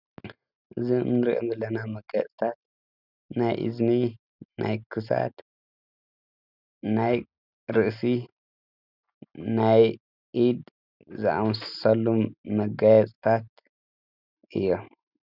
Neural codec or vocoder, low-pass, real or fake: none; 5.4 kHz; real